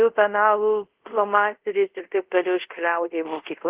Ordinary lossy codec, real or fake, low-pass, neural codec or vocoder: Opus, 16 kbps; fake; 3.6 kHz; codec, 24 kHz, 0.5 kbps, DualCodec